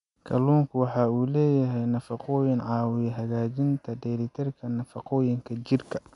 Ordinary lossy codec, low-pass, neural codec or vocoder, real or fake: none; 10.8 kHz; none; real